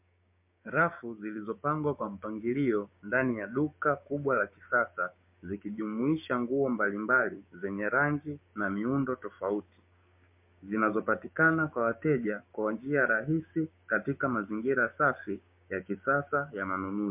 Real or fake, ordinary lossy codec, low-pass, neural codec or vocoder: fake; MP3, 32 kbps; 3.6 kHz; codec, 16 kHz, 6 kbps, DAC